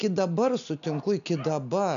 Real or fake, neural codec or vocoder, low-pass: real; none; 7.2 kHz